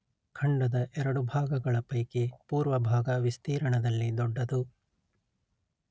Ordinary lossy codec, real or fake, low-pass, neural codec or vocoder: none; real; none; none